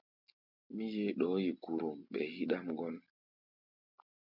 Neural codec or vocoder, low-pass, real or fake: none; 5.4 kHz; real